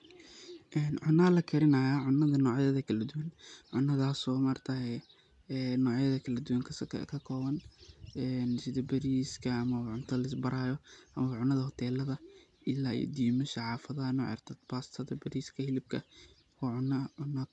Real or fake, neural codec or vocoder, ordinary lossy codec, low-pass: real; none; none; 10.8 kHz